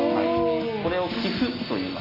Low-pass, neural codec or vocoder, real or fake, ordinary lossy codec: 5.4 kHz; none; real; AAC, 24 kbps